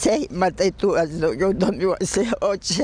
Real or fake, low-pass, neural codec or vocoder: real; 9.9 kHz; none